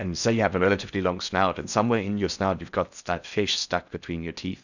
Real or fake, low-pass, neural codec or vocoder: fake; 7.2 kHz; codec, 16 kHz in and 24 kHz out, 0.6 kbps, FocalCodec, streaming, 4096 codes